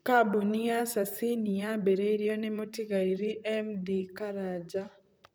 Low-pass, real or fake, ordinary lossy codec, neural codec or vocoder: none; fake; none; vocoder, 44.1 kHz, 128 mel bands, Pupu-Vocoder